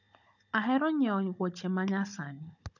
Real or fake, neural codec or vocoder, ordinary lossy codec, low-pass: fake; codec, 16 kHz, 16 kbps, FunCodec, trained on Chinese and English, 50 frames a second; none; 7.2 kHz